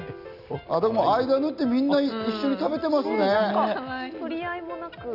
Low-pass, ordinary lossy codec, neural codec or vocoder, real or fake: 5.4 kHz; none; none; real